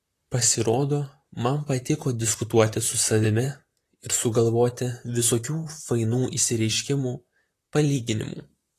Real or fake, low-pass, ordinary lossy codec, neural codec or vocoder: fake; 14.4 kHz; AAC, 48 kbps; vocoder, 44.1 kHz, 128 mel bands, Pupu-Vocoder